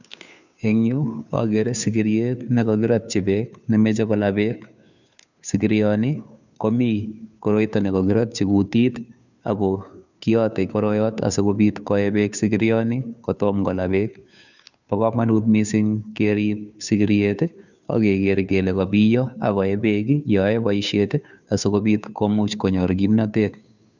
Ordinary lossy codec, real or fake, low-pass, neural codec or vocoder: none; fake; 7.2 kHz; codec, 16 kHz, 2 kbps, FunCodec, trained on Chinese and English, 25 frames a second